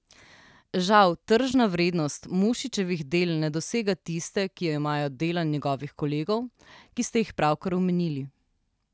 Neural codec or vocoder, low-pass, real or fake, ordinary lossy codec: none; none; real; none